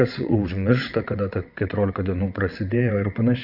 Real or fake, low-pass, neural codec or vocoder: fake; 5.4 kHz; vocoder, 22.05 kHz, 80 mel bands, WaveNeXt